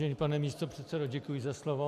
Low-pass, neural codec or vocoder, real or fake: 14.4 kHz; none; real